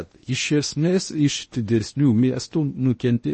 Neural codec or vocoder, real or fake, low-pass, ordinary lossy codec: codec, 16 kHz in and 24 kHz out, 0.8 kbps, FocalCodec, streaming, 65536 codes; fake; 10.8 kHz; MP3, 32 kbps